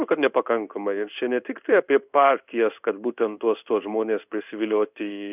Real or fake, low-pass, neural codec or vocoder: fake; 3.6 kHz; codec, 16 kHz in and 24 kHz out, 1 kbps, XY-Tokenizer